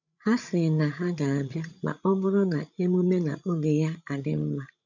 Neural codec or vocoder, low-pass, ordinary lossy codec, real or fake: codec, 16 kHz, 8 kbps, FreqCodec, larger model; 7.2 kHz; none; fake